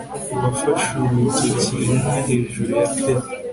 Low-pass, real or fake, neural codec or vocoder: 10.8 kHz; real; none